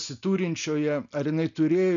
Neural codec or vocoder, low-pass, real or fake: none; 7.2 kHz; real